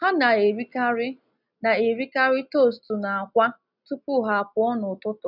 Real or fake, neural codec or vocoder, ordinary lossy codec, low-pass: real; none; none; 5.4 kHz